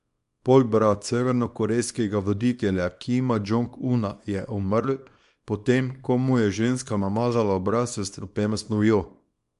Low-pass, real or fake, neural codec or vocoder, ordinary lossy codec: 10.8 kHz; fake; codec, 24 kHz, 0.9 kbps, WavTokenizer, small release; MP3, 64 kbps